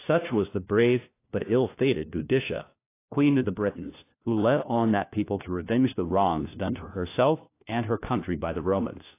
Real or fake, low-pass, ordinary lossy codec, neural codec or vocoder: fake; 3.6 kHz; AAC, 24 kbps; codec, 16 kHz, 1 kbps, FunCodec, trained on LibriTTS, 50 frames a second